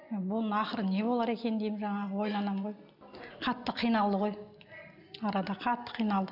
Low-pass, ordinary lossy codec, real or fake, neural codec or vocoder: 5.4 kHz; none; real; none